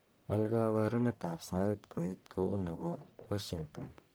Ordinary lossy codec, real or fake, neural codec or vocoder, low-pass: none; fake; codec, 44.1 kHz, 1.7 kbps, Pupu-Codec; none